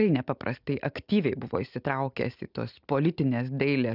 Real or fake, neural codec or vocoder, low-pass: real; none; 5.4 kHz